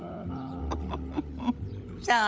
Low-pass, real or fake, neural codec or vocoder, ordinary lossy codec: none; fake; codec, 16 kHz, 16 kbps, FunCodec, trained on LibriTTS, 50 frames a second; none